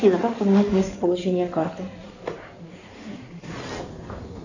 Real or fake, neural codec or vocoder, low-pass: fake; codec, 16 kHz in and 24 kHz out, 2.2 kbps, FireRedTTS-2 codec; 7.2 kHz